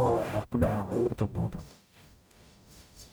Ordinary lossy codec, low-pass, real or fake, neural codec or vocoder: none; none; fake; codec, 44.1 kHz, 0.9 kbps, DAC